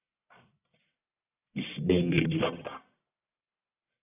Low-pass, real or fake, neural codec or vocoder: 3.6 kHz; fake; codec, 44.1 kHz, 1.7 kbps, Pupu-Codec